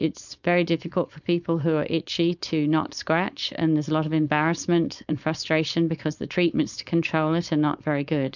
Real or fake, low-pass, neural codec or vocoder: fake; 7.2 kHz; codec, 16 kHz, 4.8 kbps, FACodec